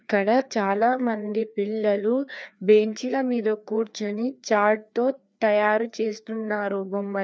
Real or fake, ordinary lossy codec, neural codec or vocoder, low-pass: fake; none; codec, 16 kHz, 2 kbps, FreqCodec, larger model; none